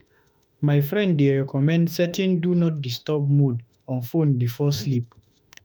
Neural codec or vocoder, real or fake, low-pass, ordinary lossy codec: autoencoder, 48 kHz, 32 numbers a frame, DAC-VAE, trained on Japanese speech; fake; none; none